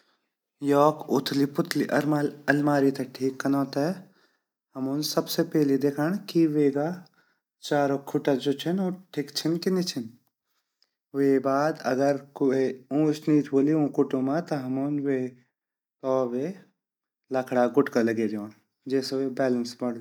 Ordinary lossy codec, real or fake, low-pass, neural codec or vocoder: none; real; 19.8 kHz; none